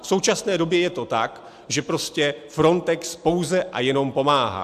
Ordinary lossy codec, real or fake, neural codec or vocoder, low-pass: AAC, 96 kbps; real; none; 14.4 kHz